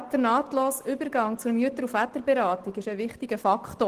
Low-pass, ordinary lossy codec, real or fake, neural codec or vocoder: 14.4 kHz; Opus, 16 kbps; real; none